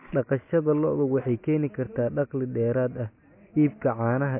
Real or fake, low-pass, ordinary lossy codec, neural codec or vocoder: real; 3.6 kHz; MP3, 24 kbps; none